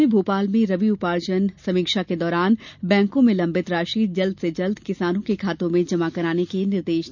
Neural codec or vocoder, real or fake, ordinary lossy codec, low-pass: none; real; none; 7.2 kHz